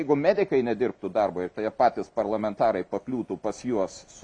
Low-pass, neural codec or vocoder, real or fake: 9.9 kHz; none; real